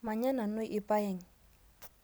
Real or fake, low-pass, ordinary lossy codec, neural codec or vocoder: real; none; none; none